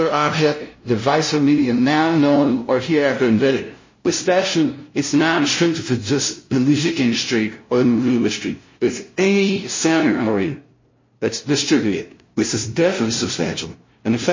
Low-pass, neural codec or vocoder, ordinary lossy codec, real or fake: 7.2 kHz; codec, 16 kHz, 0.5 kbps, FunCodec, trained on LibriTTS, 25 frames a second; MP3, 32 kbps; fake